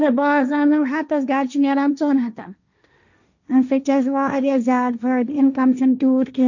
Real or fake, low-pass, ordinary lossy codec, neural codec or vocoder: fake; 7.2 kHz; none; codec, 16 kHz, 1.1 kbps, Voila-Tokenizer